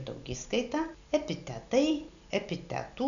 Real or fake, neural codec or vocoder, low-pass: real; none; 7.2 kHz